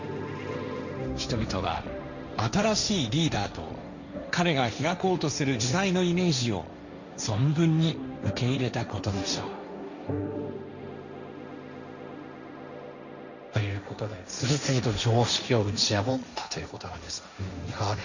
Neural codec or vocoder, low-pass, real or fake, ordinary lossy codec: codec, 16 kHz, 1.1 kbps, Voila-Tokenizer; 7.2 kHz; fake; none